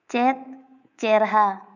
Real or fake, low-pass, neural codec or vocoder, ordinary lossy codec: fake; 7.2 kHz; codec, 24 kHz, 3.1 kbps, DualCodec; none